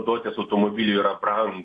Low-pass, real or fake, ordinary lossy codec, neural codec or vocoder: 10.8 kHz; real; AAC, 48 kbps; none